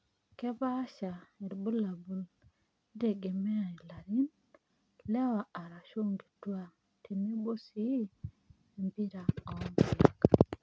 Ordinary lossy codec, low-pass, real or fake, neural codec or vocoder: none; none; real; none